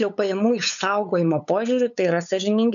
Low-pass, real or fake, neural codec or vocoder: 7.2 kHz; fake; codec, 16 kHz, 8 kbps, FunCodec, trained on LibriTTS, 25 frames a second